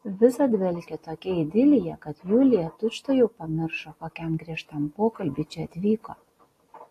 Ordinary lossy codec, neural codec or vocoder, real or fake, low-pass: AAC, 48 kbps; none; real; 14.4 kHz